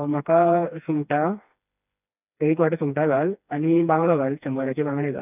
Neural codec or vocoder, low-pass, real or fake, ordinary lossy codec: codec, 16 kHz, 2 kbps, FreqCodec, smaller model; 3.6 kHz; fake; none